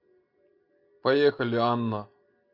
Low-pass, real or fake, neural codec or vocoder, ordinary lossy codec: 5.4 kHz; real; none; AAC, 32 kbps